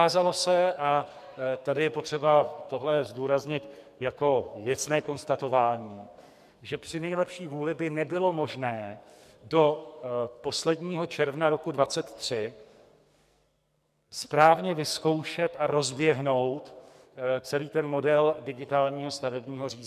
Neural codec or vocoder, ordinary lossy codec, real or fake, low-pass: codec, 44.1 kHz, 2.6 kbps, SNAC; MP3, 96 kbps; fake; 14.4 kHz